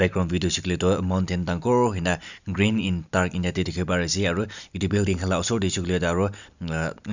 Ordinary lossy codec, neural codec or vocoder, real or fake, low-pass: none; none; real; 7.2 kHz